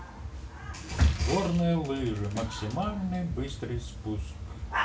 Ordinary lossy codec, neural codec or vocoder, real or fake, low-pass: none; none; real; none